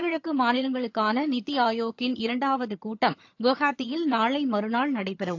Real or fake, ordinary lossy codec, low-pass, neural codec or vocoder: fake; AAC, 48 kbps; 7.2 kHz; vocoder, 22.05 kHz, 80 mel bands, HiFi-GAN